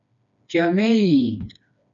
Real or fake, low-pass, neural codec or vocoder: fake; 7.2 kHz; codec, 16 kHz, 2 kbps, FreqCodec, smaller model